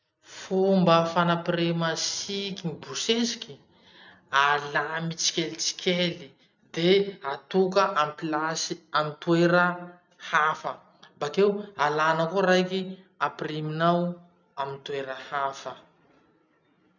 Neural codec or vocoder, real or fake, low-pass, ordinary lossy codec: none; real; 7.2 kHz; none